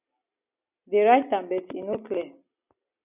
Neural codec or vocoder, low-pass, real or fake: none; 3.6 kHz; real